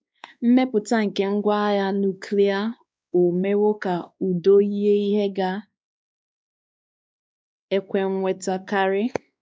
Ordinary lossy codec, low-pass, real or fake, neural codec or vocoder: none; none; fake; codec, 16 kHz, 2 kbps, X-Codec, WavLM features, trained on Multilingual LibriSpeech